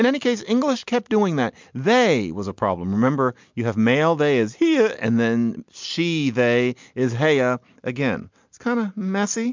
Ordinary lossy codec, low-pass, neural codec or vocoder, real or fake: MP3, 64 kbps; 7.2 kHz; none; real